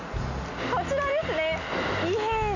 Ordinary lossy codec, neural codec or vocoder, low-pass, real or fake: AAC, 48 kbps; none; 7.2 kHz; real